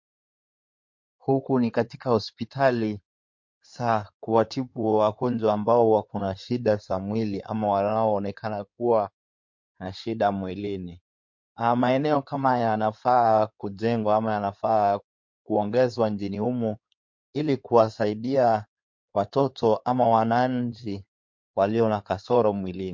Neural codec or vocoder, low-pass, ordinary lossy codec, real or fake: codec, 16 kHz in and 24 kHz out, 2.2 kbps, FireRedTTS-2 codec; 7.2 kHz; MP3, 48 kbps; fake